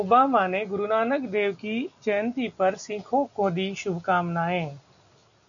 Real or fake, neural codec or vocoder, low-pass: real; none; 7.2 kHz